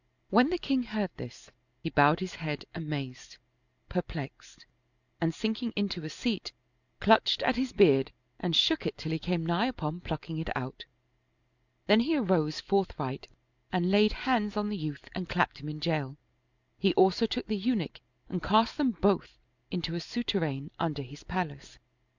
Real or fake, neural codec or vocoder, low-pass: real; none; 7.2 kHz